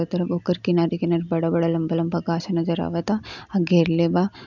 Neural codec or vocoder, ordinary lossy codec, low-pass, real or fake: none; none; 7.2 kHz; real